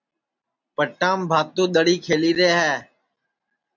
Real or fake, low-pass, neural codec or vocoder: real; 7.2 kHz; none